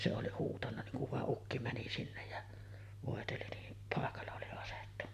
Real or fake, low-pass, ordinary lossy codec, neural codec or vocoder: real; 14.4 kHz; MP3, 96 kbps; none